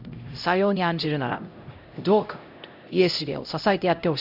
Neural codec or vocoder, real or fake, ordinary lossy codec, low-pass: codec, 16 kHz, 0.5 kbps, X-Codec, HuBERT features, trained on LibriSpeech; fake; none; 5.4 kHz